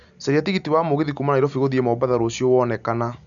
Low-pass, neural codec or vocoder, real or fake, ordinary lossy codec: 7.2 kHz; none; real; none